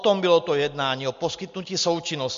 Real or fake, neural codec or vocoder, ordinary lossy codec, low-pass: real; none; MP3, 48 kbps; 7.2 kHz